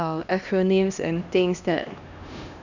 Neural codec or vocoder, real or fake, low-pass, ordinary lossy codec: codec, 16 kHz, 1 kbps, X-Codec, HuBERT features, trained on LibriSpeech; fake; 7.2 kHz; none